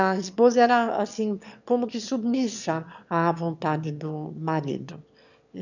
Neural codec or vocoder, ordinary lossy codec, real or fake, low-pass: autoencoder, 22.05 kHz, a latent of 192 numbers a frame, VITS, trained on one speaker; none; fake; 7.2 kHz